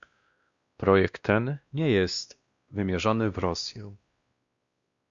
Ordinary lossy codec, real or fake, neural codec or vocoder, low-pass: Opus, 64 kbps; fake; codec, 16 kHz, 1 kbps, X-Codec, WavLM features, trained on Multilingual LibriSpeech; 7.2 kHz